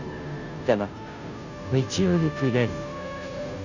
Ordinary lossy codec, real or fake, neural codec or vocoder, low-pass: none; fake; codec, 16 kHz, 0.5 kbps, FunCodec, trained on Chinese and English, 25 frames a second; 7.2 kHz